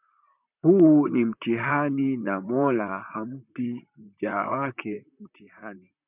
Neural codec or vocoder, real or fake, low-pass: vocoder, 44.1 kHz, 128 mel bands, Pupu-Vocoder; fake; 3.6 kHz